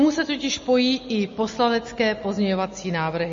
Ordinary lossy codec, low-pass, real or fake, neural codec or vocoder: MP3, 32 kbps; 7.2 kHz; real; none